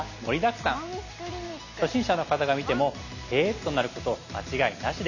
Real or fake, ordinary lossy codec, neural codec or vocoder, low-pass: real; none; none; 7.2 kHz